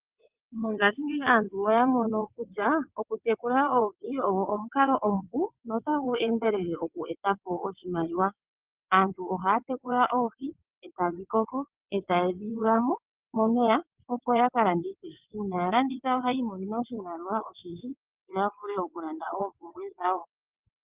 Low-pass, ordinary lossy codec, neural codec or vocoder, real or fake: 3.6 kHz; Opus, 32 kbps; vocoder, 22.05 kHz, 80 mel bands, WaveNeXt; fake